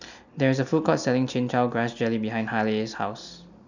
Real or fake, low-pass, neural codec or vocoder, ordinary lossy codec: real; 7.2 kHz; none; none